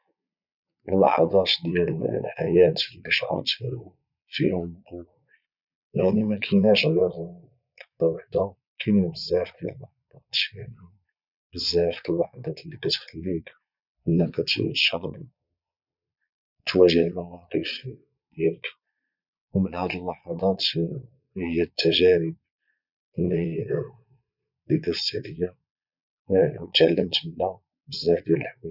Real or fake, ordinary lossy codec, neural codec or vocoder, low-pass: fake; none; vocoder, 22.05 kHz, 80 mel bands, Vocos; 5.4 kHz